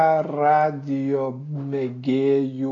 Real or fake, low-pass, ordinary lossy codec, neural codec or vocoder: real; 7.2 kHz; AAC, 32 kbps; none